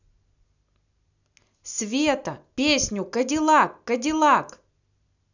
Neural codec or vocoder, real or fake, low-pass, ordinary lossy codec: none; real; 7.2 kHz; none